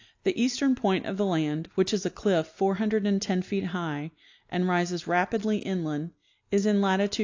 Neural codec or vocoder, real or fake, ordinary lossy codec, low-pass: none; real; AAC, 48 kbps; 7.2 kHz